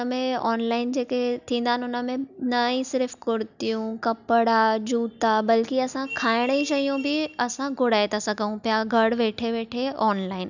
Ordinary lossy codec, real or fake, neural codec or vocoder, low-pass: none; real; none; 7.2 kHz